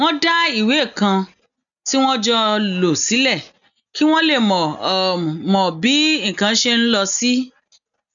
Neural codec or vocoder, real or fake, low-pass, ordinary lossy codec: none; real; 7.2 kHz; none